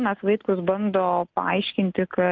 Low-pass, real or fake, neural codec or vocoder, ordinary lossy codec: 7.2 kHz; real; none; Opus, 24 kbps